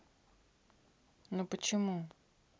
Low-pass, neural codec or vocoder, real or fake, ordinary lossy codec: none; none; real; none